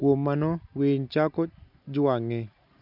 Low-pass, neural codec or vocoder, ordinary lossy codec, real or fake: 5.4 kHz; none; none; real